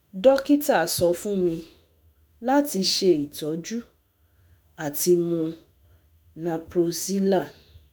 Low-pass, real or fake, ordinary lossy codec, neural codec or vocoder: none; fake; none; autoencoder, 48 kHz, 32 numbers a frame, DAC-VAE, trained on Japanese speech